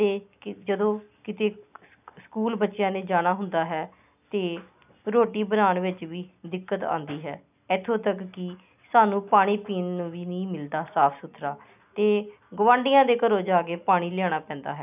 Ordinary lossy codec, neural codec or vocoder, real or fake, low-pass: none; none; real; 3.6 kHz